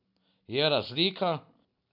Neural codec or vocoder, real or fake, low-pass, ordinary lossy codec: none; real; 5.4 kHz; none